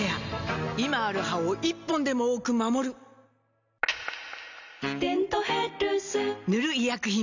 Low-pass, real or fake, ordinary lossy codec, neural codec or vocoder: 7.2 kHz; real; none; none